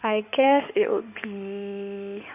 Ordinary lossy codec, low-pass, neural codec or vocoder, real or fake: none; 3.6 kHz; codec, 16 kHz in and 24 kHz out, 2.2 kbps, FireRedTTS-2 codec; fake